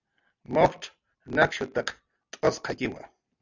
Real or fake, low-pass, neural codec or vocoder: real; 7.2 kHz; none